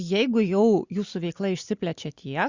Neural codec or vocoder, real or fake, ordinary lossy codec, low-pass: none; real; Opus, 64 kbps; 7.2 kHz